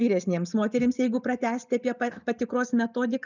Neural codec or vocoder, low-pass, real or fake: none; 7.2 kHz; real